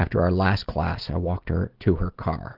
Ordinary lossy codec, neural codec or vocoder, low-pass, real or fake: Opus, 16 kbps; none; 5.4 kHz; real